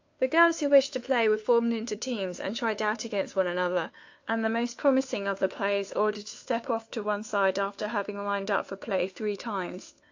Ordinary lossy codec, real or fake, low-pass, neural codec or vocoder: AAC, 48 kbps; fake; 7.2 kHz; codec, 16 kHz, 2 kbps, FunCodec, trained on Chinese and English, 25 frames a second